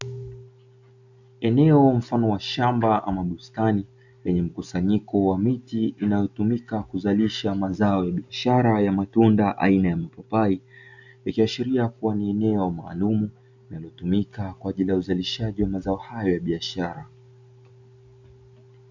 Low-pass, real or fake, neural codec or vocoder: 7.2 kHz; real; none